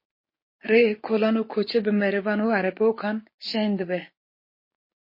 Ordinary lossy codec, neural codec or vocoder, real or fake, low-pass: MP3, 24 kbps; codec, 16 kHz, 6 kbps, DAC; fake; 5.4 kHz